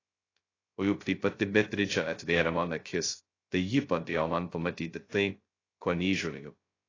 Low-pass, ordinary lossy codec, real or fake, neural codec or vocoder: 7.2 kHz; AAC, 32 kbps; fake; codec, 16 kHz, 0.2 kbps, FocalCodec